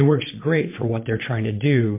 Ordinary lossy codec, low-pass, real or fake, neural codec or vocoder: MP3, 24 kbps; 3.6 kHz; fake; vocoder, 22.05 kHz, 80 mel bands, Vocos